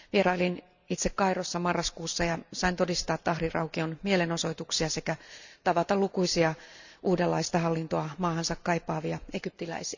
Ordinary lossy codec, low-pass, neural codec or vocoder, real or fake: none; 7.2 kHz; none; real